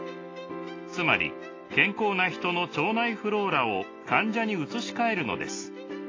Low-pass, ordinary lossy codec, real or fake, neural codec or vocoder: 7.2 kHz; AAC, 32 kbps; real; none